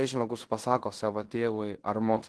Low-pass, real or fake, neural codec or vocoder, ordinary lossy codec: 10.8 kHz; fake; codec, 16 kHz in and 24 kHz out, 0.9 kbps, LongCat-Audio-Codec, fine tuned four codebook decoder; Opus, 16 kbps